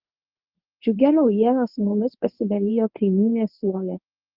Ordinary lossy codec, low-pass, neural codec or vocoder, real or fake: Opus, 24 kbps; 5.4 kHz; codec, 24 kHz, 0.9 kbps, WavTokenizer, medium speech release version 1; fake